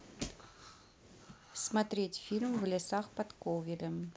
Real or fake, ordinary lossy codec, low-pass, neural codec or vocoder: real; none; none; none